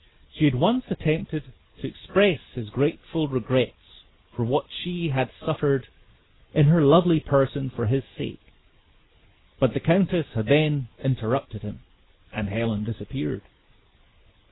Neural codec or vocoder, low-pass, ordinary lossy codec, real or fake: none; 7.2 kHz; AAC, 16 kbps; real